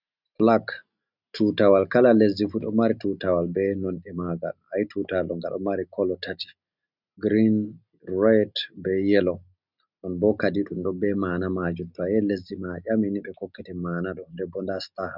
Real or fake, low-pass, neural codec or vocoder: real; 5.4 kHz; none